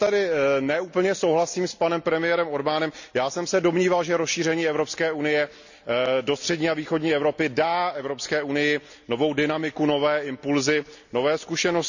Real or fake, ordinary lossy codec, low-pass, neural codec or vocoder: real; none; 7.2 kHz; none